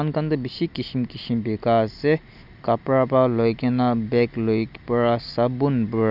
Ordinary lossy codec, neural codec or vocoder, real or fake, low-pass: none; none; real; 5.4 kHz